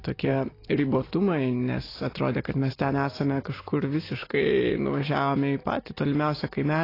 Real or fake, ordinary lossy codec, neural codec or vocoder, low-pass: real; AAC, 24 kbps; none; 5.4 kHz